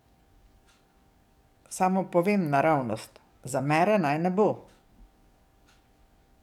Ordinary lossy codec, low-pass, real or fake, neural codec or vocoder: none; 19.8 kHz; fake; codec, 44.1 kHz, 7.8 kbps, DAC